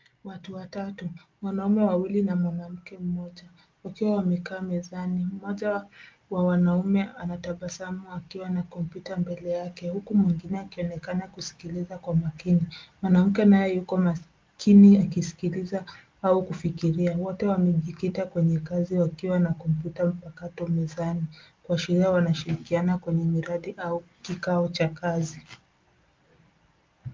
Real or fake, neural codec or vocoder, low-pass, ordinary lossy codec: real; none; 7.2 kHz; Opus, 32 kbps